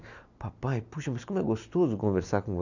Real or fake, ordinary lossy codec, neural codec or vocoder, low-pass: real; none; none; 7.2 kHz